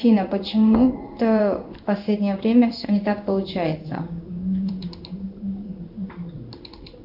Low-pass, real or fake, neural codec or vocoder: 5.4 kHz; fake; codec, 16 kHz in and 24 kHz out, 1 kbps, XY-Tokenizer